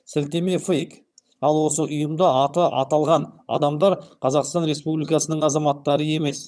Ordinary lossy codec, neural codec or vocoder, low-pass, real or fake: none; vocoder, 22.05 kHz, 80 mel bands, HiFi-GAN; none; fake